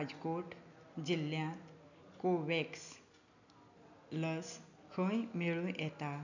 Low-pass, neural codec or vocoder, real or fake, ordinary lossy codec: 7.2 kHz; none; real; none